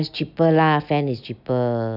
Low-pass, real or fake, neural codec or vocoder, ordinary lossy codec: 5.4 kHz; real; none; none